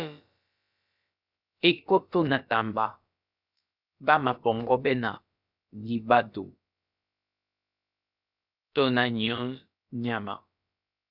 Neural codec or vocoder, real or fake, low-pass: codec, 16 kHz, about 1 kbps, DyCAST, with the encoder's durations; fake; 5.4 kHz